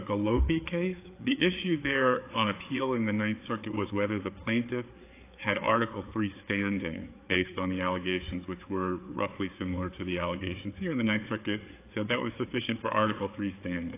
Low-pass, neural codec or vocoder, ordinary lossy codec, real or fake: 3.6 kHz; codec, 16 kHz, 4 kbps, FreqCodec, larger model; AAC, 24 kbps; fake